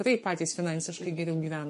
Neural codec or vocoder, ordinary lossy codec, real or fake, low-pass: codec, 44.1 kHz, 7.8 kbps, Pupu-Codec; MP3, 48 kbps; fake; 14.4 kHz